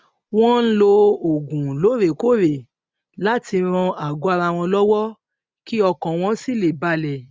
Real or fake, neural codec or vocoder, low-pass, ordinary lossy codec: real; none; none; none